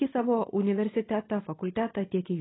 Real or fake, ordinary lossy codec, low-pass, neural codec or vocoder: real; AAC, 16 kbps; 7.2 kHz; none